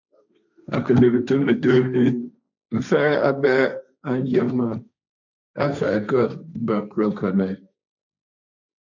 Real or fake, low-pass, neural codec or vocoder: fake; 7.2 kHz; codec, 16 kHz, 1.1 kbps, Voila-Tokenizer